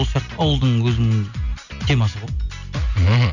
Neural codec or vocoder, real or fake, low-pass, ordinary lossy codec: none; real; 7.2 kHz; none